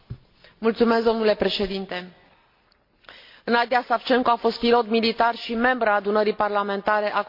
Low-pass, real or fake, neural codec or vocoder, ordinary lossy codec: 5.4 kHz; real; none; MP3, 32 kbps